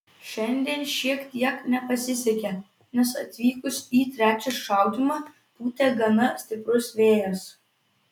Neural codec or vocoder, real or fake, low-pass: none; real; 19.8 kHz